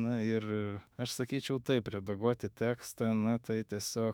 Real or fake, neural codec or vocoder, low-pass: fake; autoencoder, 48 kHz, 32 numbers a frame, DAC-VAE, trained on Japanese speech; 19.8 kHz